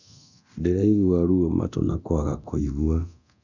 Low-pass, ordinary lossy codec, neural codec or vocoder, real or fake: 7.2 kHz; none; codec, 24 kHz, 0.9 kbps, DualCodec; fake